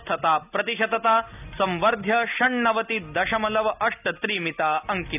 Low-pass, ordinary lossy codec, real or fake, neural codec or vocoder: 3.6 kHz; none; real; none